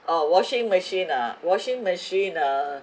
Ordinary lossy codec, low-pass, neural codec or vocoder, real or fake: none; none; none; real